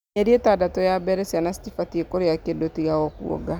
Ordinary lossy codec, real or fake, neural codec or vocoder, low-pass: none; real; none; none